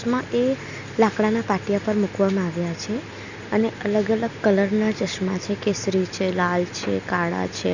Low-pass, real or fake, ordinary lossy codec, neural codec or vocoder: 7.2 kHz; real; none; none